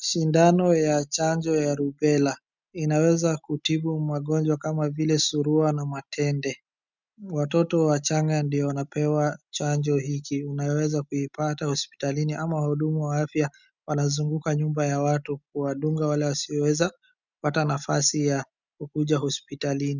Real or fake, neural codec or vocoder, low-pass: real; none; 7.2 kHz